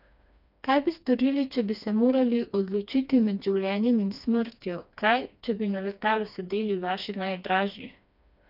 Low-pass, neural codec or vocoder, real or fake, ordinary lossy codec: 5.4 kHz; codec, 16 kHz, 2 kbps, FreqCodec, smaller model; fake; none